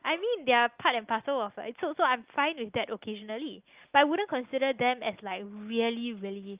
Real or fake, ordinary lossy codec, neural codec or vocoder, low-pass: real; Opus, 32 kbps; none; 3.6 kHz